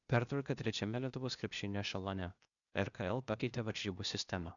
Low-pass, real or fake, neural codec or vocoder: 7.2 kHz; fake; codec, 16 kHz, 0.8 kbps, ZipCodec